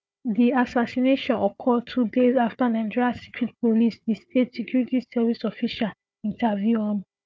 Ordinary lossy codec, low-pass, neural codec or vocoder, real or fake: none; none; codec, 16 kHz, 4 kbps, FunCodec, trained on Chinese and English, 50 frames a second; fake